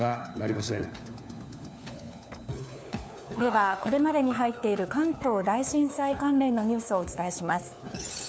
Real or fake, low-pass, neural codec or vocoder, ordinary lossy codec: fake; none; codec, 16 kHz, 4 kbps, FunCodec, trained on LibriTTS, 50 frames a second; none